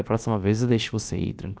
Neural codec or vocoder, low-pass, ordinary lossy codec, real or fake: codec, 16 kHz, about 1 kbps, DyCAST, with the encoder's durations; none; none; fake